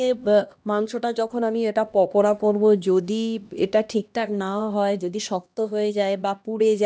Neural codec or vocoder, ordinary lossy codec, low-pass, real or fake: codec, 16 kHz, 1 kbps, X-Codec, HuBERT features, trained on LibriSpeech; none; none; fake